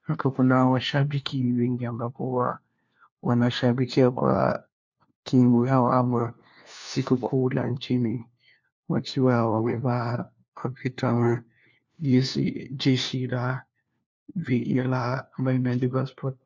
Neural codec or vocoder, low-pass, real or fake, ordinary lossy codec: codec, 16 kHz, 1 kbps, FunCodec, trained on LibriTTS, 50 frames a second; 7.2 kHz; fake; MP3, 64 kbps